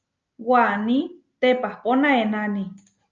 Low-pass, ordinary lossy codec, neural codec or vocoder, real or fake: 7.2 kHz; Opus, 24 kbps; none; real